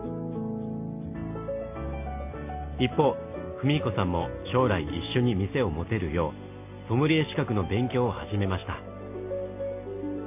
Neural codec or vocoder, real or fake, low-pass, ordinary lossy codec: none; real; 3.6 kHz; MP3, 32 kbps